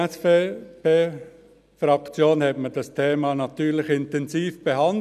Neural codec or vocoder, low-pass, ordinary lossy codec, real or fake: none; 14.4 kHz; none; real